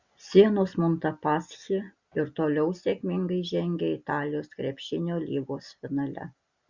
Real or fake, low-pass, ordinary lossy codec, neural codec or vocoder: real; 7.2 kHz; Opus, 64 kbps; none